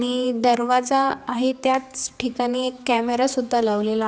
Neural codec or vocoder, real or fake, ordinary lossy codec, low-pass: codec, 16 kHz, 4 kbps, X-Codec, HuBERT features, trained on general audio; fake; none; none